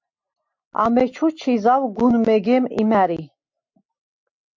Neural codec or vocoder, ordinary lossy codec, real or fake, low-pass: none; MP3, 48 kbps; real; 7.2 kHz